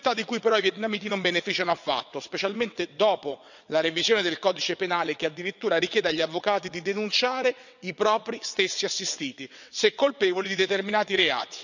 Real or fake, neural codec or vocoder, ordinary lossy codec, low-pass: fake; vocoder, 22.05 kHz, 80 mel bands, WaveNeXt; none; 7.2 kHz